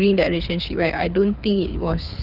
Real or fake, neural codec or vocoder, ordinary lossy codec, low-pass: fake; codec, 24 kHz, 6 kbps, HILCodec; none; 5.4 kHz